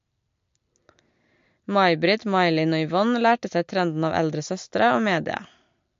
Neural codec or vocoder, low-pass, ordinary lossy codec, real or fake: none; 7.2 kHz; MP3, 48 kbps; real